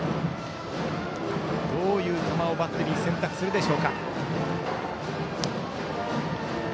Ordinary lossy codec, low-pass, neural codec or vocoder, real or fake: none; none; none; real